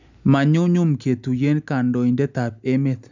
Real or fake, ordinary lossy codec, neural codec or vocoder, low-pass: real; none; none; 7.2 kHz